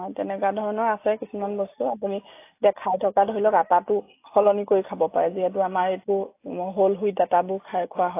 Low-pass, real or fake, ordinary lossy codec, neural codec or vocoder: 3.6 kHz; real; AAC, 24 kbps; none